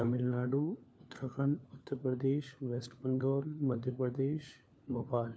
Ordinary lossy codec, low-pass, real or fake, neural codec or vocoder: none; none; fake; codec, 16 kHz, 4 kbps, FunCodec, trained on LibriTTS, 50 frames a second